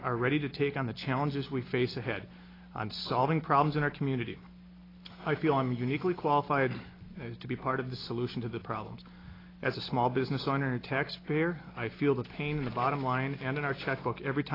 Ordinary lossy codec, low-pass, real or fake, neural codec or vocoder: AAC, 24 kbps; 5.4 kHz; real; none